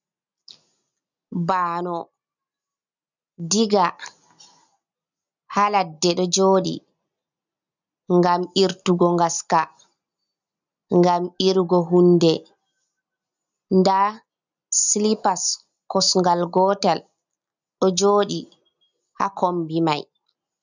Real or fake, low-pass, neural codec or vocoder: real; 7.2 kHz; none